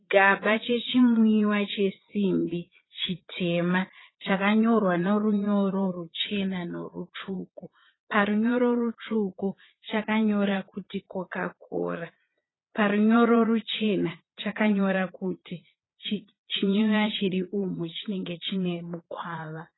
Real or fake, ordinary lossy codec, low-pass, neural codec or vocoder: fake; AAC, 16 kbps; 7.2 kHz; vocoder, 22.05 kHz, 80 mel bands, Vocos